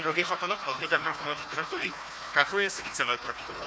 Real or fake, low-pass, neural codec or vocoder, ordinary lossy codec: fake; none; codec, 16 kHz, 1 kbps, FunCodec, trained on Chinese and English, 50 frames a second; none